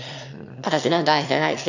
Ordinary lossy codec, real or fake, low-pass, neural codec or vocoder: none; fake; 7.2 kHz; autoencoder, 22.05 kHz, a latent of 192 numbers a frame, VITS, trained on one speaker